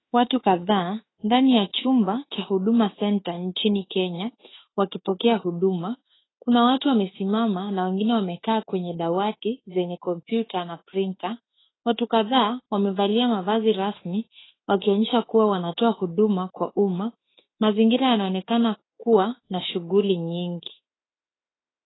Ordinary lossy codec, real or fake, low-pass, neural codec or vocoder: AAC, 16 kbps; fake; 7.2 kHz; autoencoder, 48 kHz, 32 numbers a frame, DAC-VAE, trained on Japanese speech